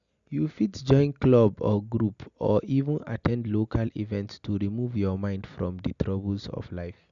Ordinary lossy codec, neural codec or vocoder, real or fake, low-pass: MP3, 96 kbps; none; real; 7.2 kHz